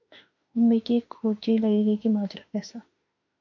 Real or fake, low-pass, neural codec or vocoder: fake; 7.2 kHz; autoencoder, 48 kHz, 32 numbers a frame, DAC-VAE, trained on Japanese speech